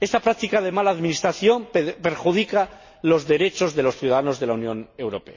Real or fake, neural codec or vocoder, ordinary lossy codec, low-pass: real; none; MP3, 32 kbps; 7.2 kHz